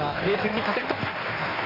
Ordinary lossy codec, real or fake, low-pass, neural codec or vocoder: none; fake; 5.4 kHz; codec, 16 kHz in and 24 kHz out, 1.1 kbps, FireRedTTS-2 codec